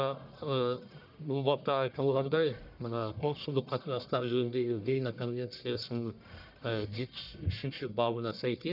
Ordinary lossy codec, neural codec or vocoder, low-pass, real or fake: none; codec, 44.1 kHz, 1.7 kbps, Pupu-Codec; 5.4 kHz; fake